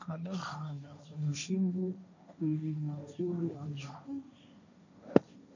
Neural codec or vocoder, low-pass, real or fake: codec, 16 kHz, 1.1 kbps, Voila-Tokenizer; 7.2 kHz; fake